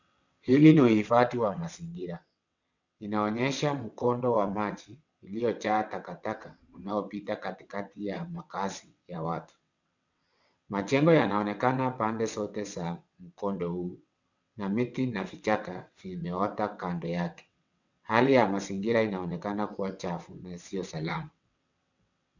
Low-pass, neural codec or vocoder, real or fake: 7.2 kHz; vocoder, 22.05 kHz, 80 mel bands, WaveNeXt; fake